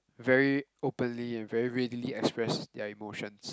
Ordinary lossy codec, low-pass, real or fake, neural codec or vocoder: none; none; real; none